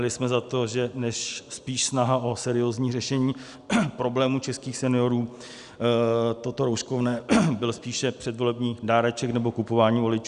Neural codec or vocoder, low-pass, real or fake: none; 10.8 kHz; real